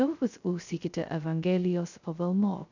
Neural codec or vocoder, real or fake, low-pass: codec, 16 kHz, 0.2 kbps, FocalCodec; fake; 7.2 kHz